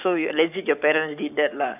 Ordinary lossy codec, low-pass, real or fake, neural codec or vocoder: none; 3.6 kHz; fake; codec, 44.1 kHz, 7.8 kbps, Pupu-Codec